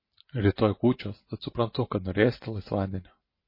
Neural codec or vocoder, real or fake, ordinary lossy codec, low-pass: none; real; MP3, 24 kbps; 5.4 kHz